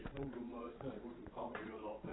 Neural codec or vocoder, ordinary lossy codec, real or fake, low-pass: vocoder, 22.05 kHz, 80 mel bands, Vocos; AAC, 16 kbps; fake; 7.2 kHz